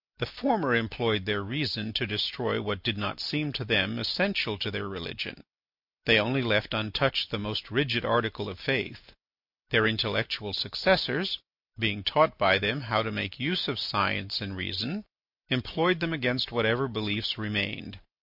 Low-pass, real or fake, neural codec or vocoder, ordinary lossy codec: 5.4 kHz; real; none; MP3, 48 kbps